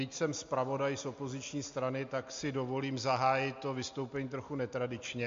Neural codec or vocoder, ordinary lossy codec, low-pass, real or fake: none; MP3, 48 kbps; 7.2 kHz; real